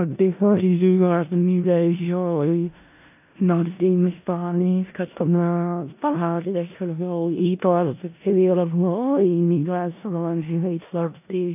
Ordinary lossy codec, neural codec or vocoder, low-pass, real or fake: AAC, 32 kbps; codec, 16 kHz in and 24 kHz out, 0.4 kbps, LongCat-Audio-Codec, four codebook decoder; 3.6 kHz; fake